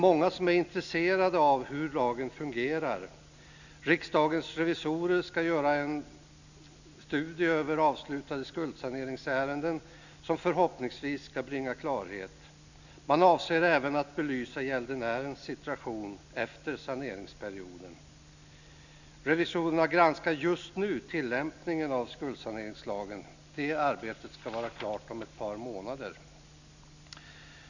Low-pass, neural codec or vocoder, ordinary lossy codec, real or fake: 7.2 kHz; none; none; real